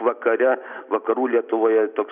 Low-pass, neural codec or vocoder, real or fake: 3.6 kHz; none; real